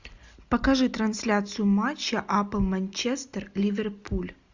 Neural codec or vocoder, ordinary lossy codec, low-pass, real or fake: none; Opus, 64 kbps; 7.2 kHz; real